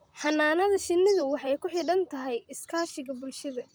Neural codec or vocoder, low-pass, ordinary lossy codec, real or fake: vocoder, 44.1 kHz, 128 mel bands, Pupu-Vocoder; none; none; fake